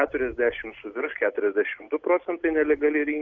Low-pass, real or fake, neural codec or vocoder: 7.2 kHz; real; none